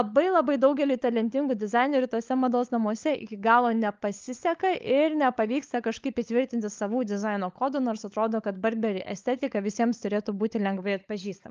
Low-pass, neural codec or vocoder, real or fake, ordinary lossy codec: 7.2 kHz; codec, 16 kHz, 16 kbps, FunCodec, trained on LibriTTS, 50 frames a second; fake; Opus, 32 kbps